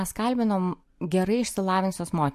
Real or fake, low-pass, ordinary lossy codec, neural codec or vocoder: real; 14.4 kHz; MP3, 64 kbps; none